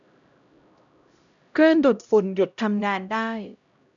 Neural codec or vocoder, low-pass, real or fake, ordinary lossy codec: codec, 16 kHz, 0.5 kbps, X-Codec, HuBERT features, trained on LibriSpeech; 7.2 kHz; fake; none